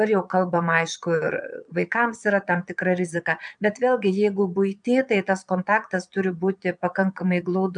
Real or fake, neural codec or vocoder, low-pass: fake; vocoder, 22.05 kHz, 80 mel bands, Vocos; 9.9 kHz